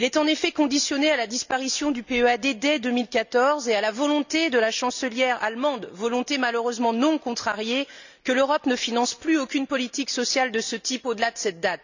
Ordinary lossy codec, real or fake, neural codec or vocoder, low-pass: none; real; none; 7.2 kHz